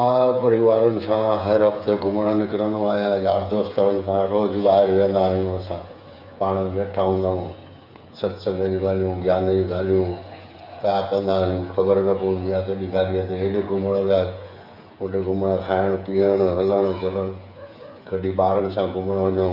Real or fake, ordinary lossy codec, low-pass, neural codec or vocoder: fake; none; 5.4 kHz; codec, 16 kHz, 8 kbps, FreqCodec, smaller model